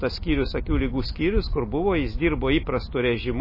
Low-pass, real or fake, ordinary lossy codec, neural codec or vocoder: 5.4 kHz; real; MP3, 24 kbps; none